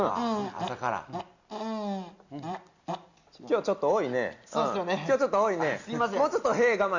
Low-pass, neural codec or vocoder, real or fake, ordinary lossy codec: 7.2 kHz; none; real; Opus, 64 kbps